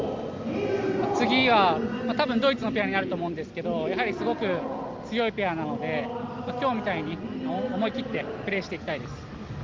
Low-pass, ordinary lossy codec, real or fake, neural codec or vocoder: 7.2 kHz; Opus, 32 kbps; real; none